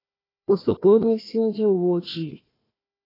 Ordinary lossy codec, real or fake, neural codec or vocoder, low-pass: AAC, 24 kbps; fake; codec, 16 kHz, 1 kbps, FunCodec, trained on Chinese and English, 50 frames a second; 5.4 kHz